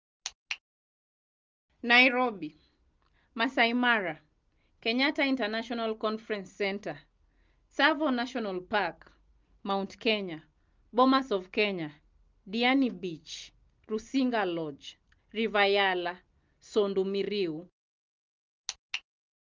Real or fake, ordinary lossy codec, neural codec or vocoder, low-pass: real; Opus, 32 kbps; none; 7.2 kHz